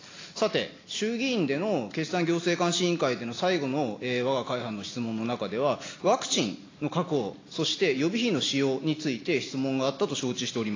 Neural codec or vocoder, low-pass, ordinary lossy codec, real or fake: none; 7.2 kHz; AAC, 32 kbps; real